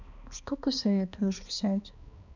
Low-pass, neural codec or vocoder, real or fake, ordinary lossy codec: 7.2 kHz; codec, 16 kHz, 2 kbps, X-Codec, HuBERT features, trained on balanced general audio; fake; none